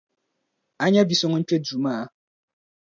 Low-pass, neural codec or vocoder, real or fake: 7.2 kHz; none; real